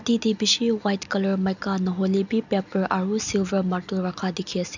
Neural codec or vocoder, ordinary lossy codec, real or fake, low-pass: none; none; real; 7.2 kHz